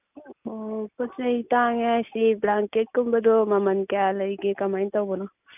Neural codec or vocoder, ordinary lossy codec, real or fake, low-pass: none; none; real; 3.6 kHz